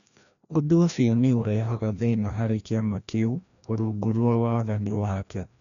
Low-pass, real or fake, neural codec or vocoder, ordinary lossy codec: 7.2 kHz; fake; codec, 16 kHz, 1 kbps, FreqCodec, larger model; none